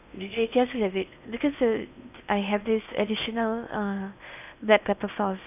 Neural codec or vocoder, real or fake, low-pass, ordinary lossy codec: codec, 16 kHz in and 24 kHz out, 0.8 kbps, FocalCodec, streaming, 65536 codes; fake; 3.6 kHz; none